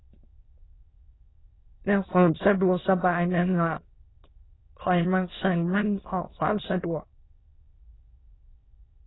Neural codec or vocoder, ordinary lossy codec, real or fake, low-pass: autoencoder, 22.05 kHz, a latent of 192 numbers a frame, VITS, trained on many speakers; AAC, 16 kbps; fake; 7.2 kHz